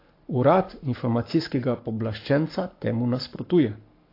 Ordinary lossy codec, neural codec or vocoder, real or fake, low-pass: AAC, 32 kbps; codec, 44.1 kHz, 7.8 kbps, Pupu-Codec; fake; 5.4 kHz